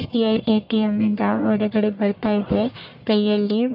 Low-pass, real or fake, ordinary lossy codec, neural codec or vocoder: 5.4 kHz; fake; none; codec, 44.1 kHz, 1.7 kbps, Pupu-Codec